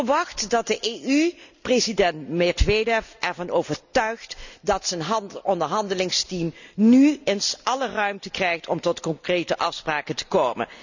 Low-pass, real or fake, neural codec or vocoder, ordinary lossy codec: 7.2 kHz; real; none; none